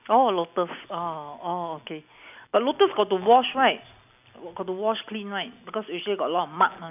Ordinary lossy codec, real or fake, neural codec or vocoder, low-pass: none; real; none; 3.6 kHz